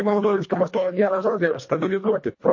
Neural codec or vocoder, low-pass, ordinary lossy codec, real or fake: codec, 24 kHz, 1.5 kbps, HILCodec; 7.2 kHz; MP3, 32 kbps; fake